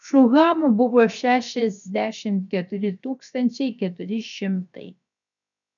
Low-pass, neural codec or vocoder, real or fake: 7.2 kHz; codec, 16 kHz, 0.7 kbps, FocalCodec; fake